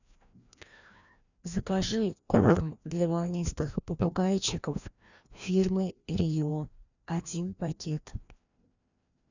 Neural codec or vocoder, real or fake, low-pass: codec, 16 kHz, 1 kbps, FreqCodec, larger model; fake; 7.2 kHz